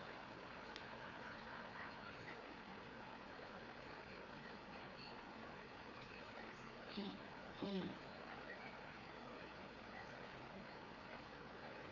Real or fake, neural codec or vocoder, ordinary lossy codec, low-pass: fake; codec, 16 kHz, 4 kbps, FreqCodec, larger model; none; 7.2 kHz